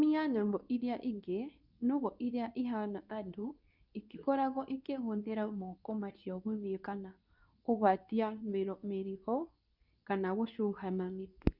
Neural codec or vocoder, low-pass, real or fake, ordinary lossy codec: codec, 24 kHz, 0.9 kbps, WavTokenizer, medium speech release version 2; 5.4 kHz; fake; none